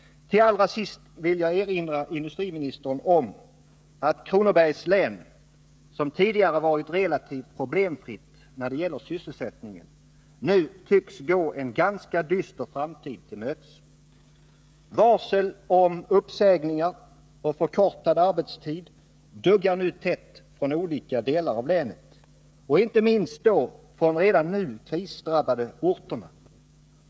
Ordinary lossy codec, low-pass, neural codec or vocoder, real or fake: none; none; codec, 16 kHz, 16 kbps, FreqCodec, smaller model; fake